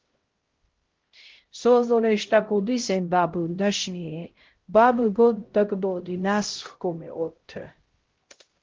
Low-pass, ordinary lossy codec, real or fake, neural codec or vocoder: 7.2 kHz; Opus, 16 kbps; fake; codec, 16 kHz, 0.5 kbps, X-Codec, HuBERT features, trained on LibriSpeech